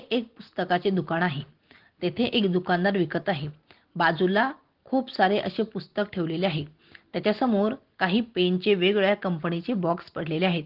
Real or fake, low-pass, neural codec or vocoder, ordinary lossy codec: real; 5.4 kHz; none; Opus, 16 kbps